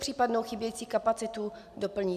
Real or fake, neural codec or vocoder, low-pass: real; none; 14.4 kHz